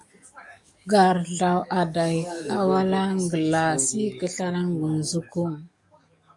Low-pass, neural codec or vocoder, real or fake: 10.8 kHz; codec, 44.1 kHz, 7.8 kbps, DAC; fake